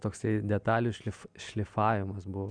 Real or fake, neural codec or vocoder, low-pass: real; none; 9.9 kHz